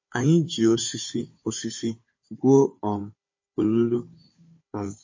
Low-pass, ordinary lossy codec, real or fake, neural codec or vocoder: 7.2 kHz; MP3, 32 kbps; fake; codec, 16 kHz, 4 kbps, FunCodec, trained on Chinese and English, 50 frames a second